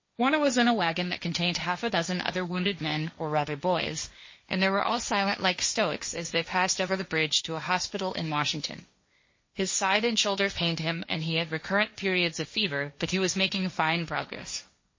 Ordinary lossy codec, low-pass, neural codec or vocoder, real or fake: MP3, 32 kbps; 7.2 kHz; codec, 16 kHz, 1.1 kbps, Voila-Tokenizer; fake